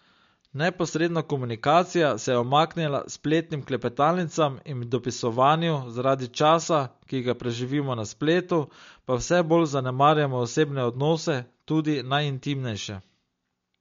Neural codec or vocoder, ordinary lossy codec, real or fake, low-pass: none; MP3, 48 kbps; real; 7.2 kHz